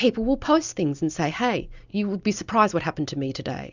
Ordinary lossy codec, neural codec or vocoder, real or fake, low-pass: Opus, 64 kbps; none; real; 7.2 kHz